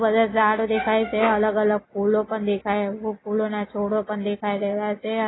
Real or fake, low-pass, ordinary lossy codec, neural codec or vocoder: real; 7.2 kHz; AAC, 16 kbps; none